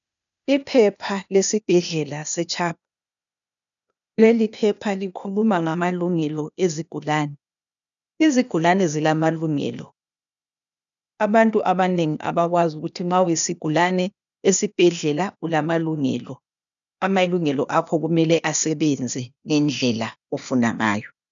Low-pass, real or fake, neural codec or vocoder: 7.2 kHz; fake; codec, 16 kHz, 0.8 kbps, ZipCodec